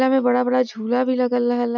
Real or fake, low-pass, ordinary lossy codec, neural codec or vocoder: real; 7.2 kHz; none; none